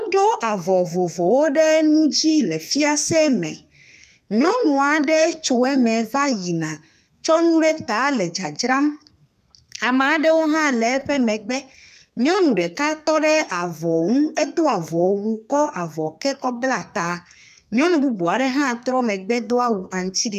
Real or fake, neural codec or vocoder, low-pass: fake; codec, 32 kHz, 1.9 kbps, SNAC; 14.4 kHz